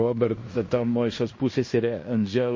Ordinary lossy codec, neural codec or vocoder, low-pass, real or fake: MP3, 32 kbps; codec, 16 kHz in and 24 kHz out, 0.9 kbps, LongCat-Audio-Codec, fine tuned four codebook decoder; 7.2 kHz; fake